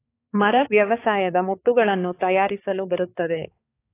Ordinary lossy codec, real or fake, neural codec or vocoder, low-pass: AAC, 24 kbps; fake; codec, 16 kHz, 4 kbps, X-Codec, HuBERT features, trained on balanced general audio; 3.6 kHz